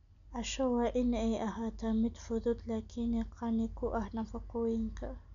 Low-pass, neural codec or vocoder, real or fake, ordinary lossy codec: 7.2 kHz; none; real; none